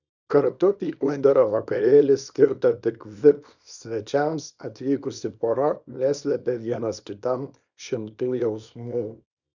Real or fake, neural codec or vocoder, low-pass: fake; codec, 24 kHz, 0.9 kbps, WavTokenizer, small release; 7.2 kHz